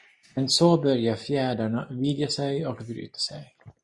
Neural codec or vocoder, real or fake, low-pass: none; real; 10.8 kHz